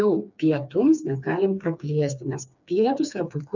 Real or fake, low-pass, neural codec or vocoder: fake; 7.2 kHz; codec, 16 kHz, 4 kbps, FreqCodec, smaller model